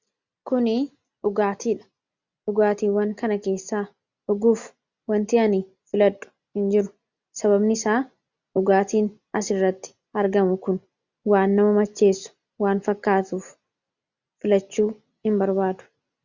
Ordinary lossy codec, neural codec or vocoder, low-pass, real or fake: Opus, 64 kbps; none; 7.2 kHz; real